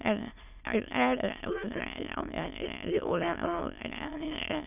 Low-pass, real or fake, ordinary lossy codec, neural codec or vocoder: 3.6 kHz; fake; none; autoencoder, 22.05 kHz, a latent of 192 numbers a frame, VITS, trained on many speakers